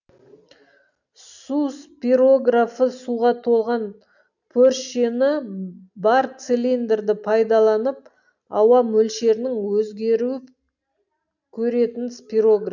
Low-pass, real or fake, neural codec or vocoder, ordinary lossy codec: 7.2 kHz; real; none; none